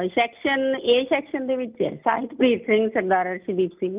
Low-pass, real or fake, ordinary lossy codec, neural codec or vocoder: 3.6 kHz; real; Opus, 32 kbps; none